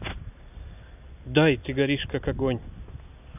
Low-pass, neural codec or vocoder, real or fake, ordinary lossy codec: 3.6 kHz; none; real; none